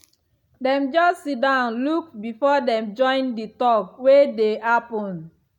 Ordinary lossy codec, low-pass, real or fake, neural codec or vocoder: none; 19.8 kHz; real; none